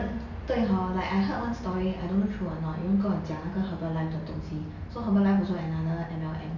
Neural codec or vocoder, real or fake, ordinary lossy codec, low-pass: none; real; none; 7.2 kHz